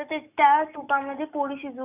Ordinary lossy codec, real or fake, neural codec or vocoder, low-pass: none; real; none; 3.6 kHz